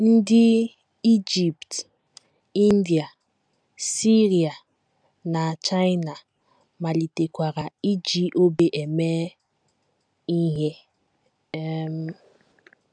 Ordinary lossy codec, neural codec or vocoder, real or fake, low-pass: none; none; real; 9.9 kHz